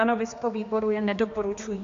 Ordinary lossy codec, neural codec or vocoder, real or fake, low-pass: AAC, 64 kbps; codec, 16 kHz, 2 kbps, X-Codec, HuBERT features, trained on general audio; fake; 7.2 kHz